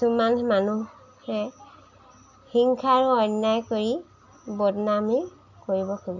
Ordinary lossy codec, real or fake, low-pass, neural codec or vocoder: none; real; 7.2 kHz; none